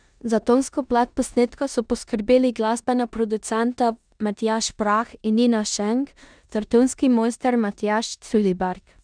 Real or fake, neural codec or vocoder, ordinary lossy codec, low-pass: fake; codec, 16 kHz in and 24 kHz out, 0.9 kbps, LongCat-Audio-Codec, four codebook decoder; none; 9.9 kHz